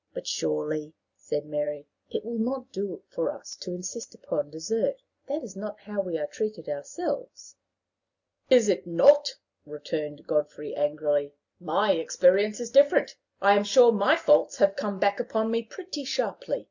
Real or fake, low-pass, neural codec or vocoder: real; 7.2 kHz; none